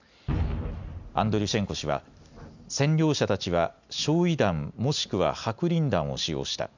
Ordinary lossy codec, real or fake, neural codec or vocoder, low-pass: none; real; none; 7.2 kHz